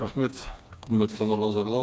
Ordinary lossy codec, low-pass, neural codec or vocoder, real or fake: none; none; codec, 16 kHz, 2 kbps, FreqCodec, smaller model; fake